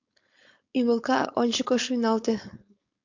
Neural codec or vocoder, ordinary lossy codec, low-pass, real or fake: codec, 16 kHz, 4.8 kbps, FACodec; AAC, 48 kbps; 7.2 kHz; fake